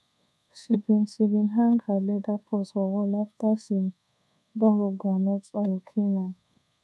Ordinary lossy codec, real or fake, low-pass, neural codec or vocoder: none; fake; none; codec, 24 kHz, 1.2 kbps, DualCodec